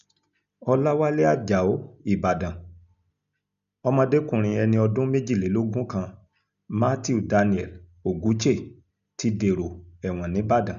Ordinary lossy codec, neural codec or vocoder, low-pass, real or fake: none; none; 7.2 kHz; real